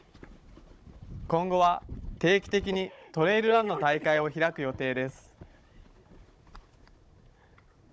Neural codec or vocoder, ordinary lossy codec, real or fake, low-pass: codec, 16 kHz, 16 kbps, FunCodec, trained on Chinese and English, 50 frames a second; none; fake; none